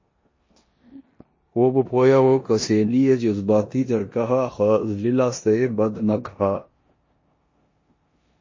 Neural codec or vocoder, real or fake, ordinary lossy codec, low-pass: codec, 16 kHz in and 24 kHz out, 0.9 kbps, LongCat-Audio-Codec, four codebook decoder; fake; MP3, 32 kbps; 7.2 kHz